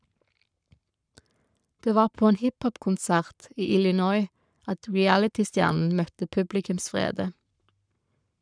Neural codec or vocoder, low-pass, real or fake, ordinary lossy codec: codec, 44.1 kHz, 7.8 kbps, Pupu-Codec; 9.9 kHz; fake; none